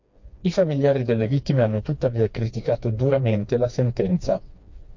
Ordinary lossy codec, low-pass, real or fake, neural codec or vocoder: MP3, 48 kbps; 7.2 kHz; fake; codec, 16 kHz, 2 kbps, FreqCodec, smaller model